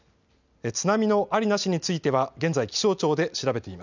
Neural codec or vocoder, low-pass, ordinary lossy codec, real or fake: none; 7.2 kHz; none; real